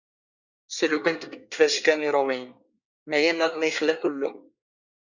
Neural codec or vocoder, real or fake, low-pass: codec, 24 kHz, 1 kbps, SNAC; fake; 7.2 kHz